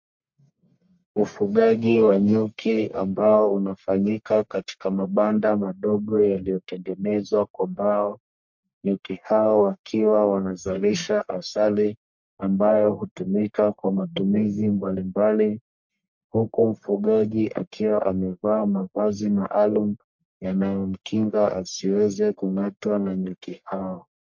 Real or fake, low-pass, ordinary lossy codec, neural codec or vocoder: fake; 7.2 kHz; MP3, 48 kbps; codec, 44.1 kHz, 1.7 kbps, Pupu-Codec